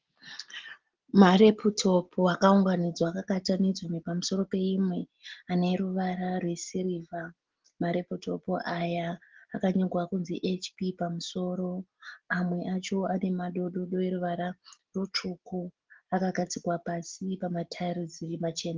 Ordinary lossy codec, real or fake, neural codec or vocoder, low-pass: Opus, 16 kbps; real; none; 7.2 kHz